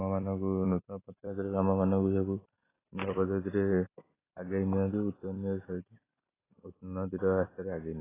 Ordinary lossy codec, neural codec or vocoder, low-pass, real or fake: AAC, 16 kbps; vocoder, 44.1 kHz, 128 mel bands every 256 samples, BigVGAN v2; 3.6 kHz; fake